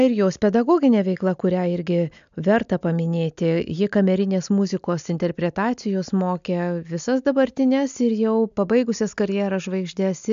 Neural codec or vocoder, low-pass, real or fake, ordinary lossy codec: none; 7.2 kHz; real; AAC, 96 kbps